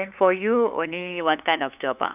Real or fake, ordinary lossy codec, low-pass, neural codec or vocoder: fake; none; 3.6 kHz; codec, 16 kHz, 2 kbps, FunCodec, trained on LibriTTS, 25 frames a second